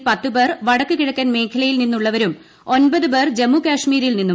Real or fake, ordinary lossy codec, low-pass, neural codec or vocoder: real; none; none; none